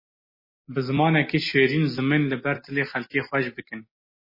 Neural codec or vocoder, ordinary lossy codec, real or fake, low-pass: none; MP3, 24 kbps; real; 5.4 kHz